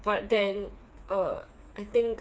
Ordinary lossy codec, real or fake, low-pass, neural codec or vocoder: none; fake; none; codec, 16 kHz, 4 kbps, FreqCodec, smaller model